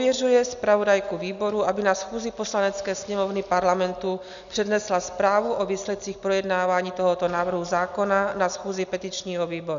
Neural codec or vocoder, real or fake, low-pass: none; real; 7.2 kHz